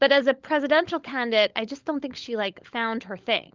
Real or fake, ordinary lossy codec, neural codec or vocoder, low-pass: fake; Opus, 24 kbps; codec, 16 kHz, 16 kbps, FunCodec, trained on LibriTTS, 50 frames a second; 7.2 kHz